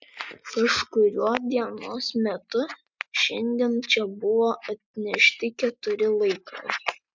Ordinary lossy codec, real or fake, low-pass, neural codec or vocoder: MP3, 64 kbps; real; 7.2 kHz; none